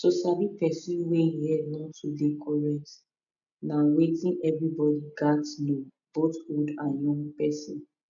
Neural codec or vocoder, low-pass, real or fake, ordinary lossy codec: none; 7.2 kHz; real; none